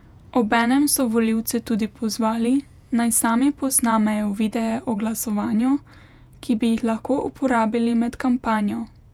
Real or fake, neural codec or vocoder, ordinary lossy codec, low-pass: fake; vocoder, 48 kHz, 128 mel bands, Vocos; none; 19.8 kHz